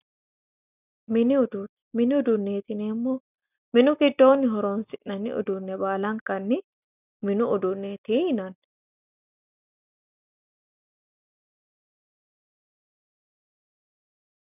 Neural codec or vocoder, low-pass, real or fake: none; 3.6 kHz; real